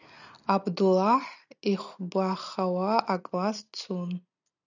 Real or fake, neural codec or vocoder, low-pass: real; none; 7.2 kHz